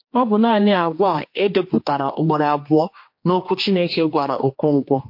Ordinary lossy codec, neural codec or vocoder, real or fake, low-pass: MP3, 32 kbps; codec, 16 kHz, 2 kbps, X-Codec, HuBERT features, trained on general audio; fake; 5.4 kHz